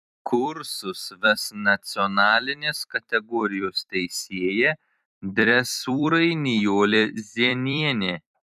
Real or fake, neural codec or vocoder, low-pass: fake; vocoder, 44.1 kHz, 128 mel bands every 256 samples, BigVGAN v2; 14.4 kHz